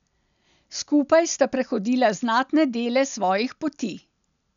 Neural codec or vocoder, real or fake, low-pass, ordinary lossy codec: none; real; 7.2 kHz; MP3, 96 kbps